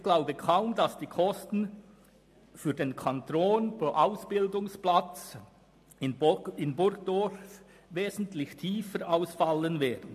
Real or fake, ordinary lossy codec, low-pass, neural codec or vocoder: real; MP3, 64 kbps; 14.4 kHz; none